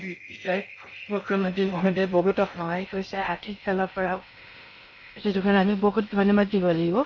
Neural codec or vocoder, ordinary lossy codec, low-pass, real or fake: codec, 16 kHz in and 24 kHz out, 0.6 kbps, FocalCodec, streaming, 4096 codes; none; 7.2 kHz; fake